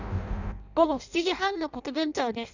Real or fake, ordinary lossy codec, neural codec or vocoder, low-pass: fake; none; codec, 16 kHz in and 24 kHz out, 0.6 kbps, FireRedTTS-2 codec; 7.2 kHz